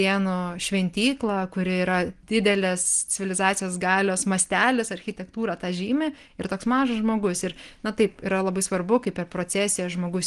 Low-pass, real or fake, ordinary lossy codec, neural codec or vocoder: 10.8 kHz; real; Opus, 24 kbps; none